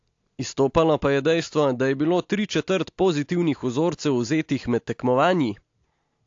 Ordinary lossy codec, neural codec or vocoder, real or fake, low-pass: AAC, 48 kbps; none; real; 7.2 kHz